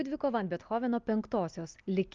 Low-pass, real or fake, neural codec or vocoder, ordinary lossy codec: 7.2 kHz; real; none; Opus, 24 kbps